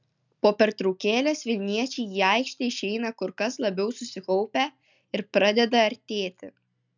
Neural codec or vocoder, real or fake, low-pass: none; real; 7.2 kHz